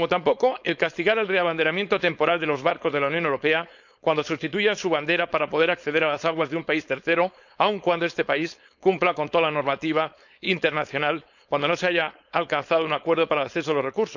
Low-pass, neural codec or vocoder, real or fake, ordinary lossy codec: 7.2 kHz; codec, 16 kHz, 4.8 kbps, FACodec; fake; none